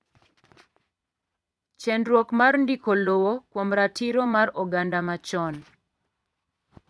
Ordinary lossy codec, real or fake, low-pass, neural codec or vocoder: none; fake; none; vocoder, 22.05 kHz, 80 mel bands, Vocos